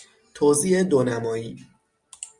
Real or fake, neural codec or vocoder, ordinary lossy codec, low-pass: real; none; Opus, 64 kbps; 10.8 kHz